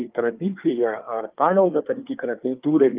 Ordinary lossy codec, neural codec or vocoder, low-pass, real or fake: Opus, 32 kbps; codec, 24 kHz, 1 kbps, SNAC; 3.6 kHz; fake